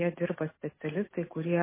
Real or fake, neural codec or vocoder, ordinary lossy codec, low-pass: real; none; MP3, 16 kbps; 3.6 kHz